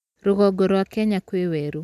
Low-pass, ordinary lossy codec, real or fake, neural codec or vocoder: 14.4 kHz; none; real; none